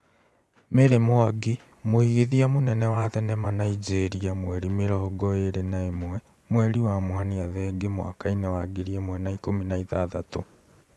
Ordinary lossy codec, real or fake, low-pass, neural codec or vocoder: none; fake; none; vocoder, 24 kHz, 100 mel bands, Vocos